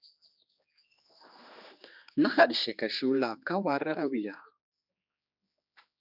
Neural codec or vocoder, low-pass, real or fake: codec, 16 kHz, 2 kbps, X-Codec, HuBERT features, trained on general audio; 5.4 kHz; fake